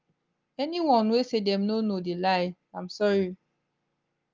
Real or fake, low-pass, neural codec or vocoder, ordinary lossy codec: real; 7.2 kHz; none; Opus, 24 kbps